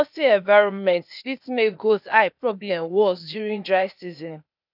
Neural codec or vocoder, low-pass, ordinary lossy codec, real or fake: codec, 16 kHz, 0.8 kbps, ZipCodec; 5.4 kHz; none; fake